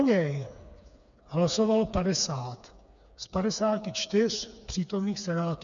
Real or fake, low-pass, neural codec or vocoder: fake; 7.2 kHz; codec, 16 kHz, 4 kbps, FreqCodec, smaller model